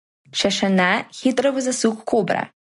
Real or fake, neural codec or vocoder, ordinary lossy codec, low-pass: real; none; MP3, 48 kbps; 10.8 kHz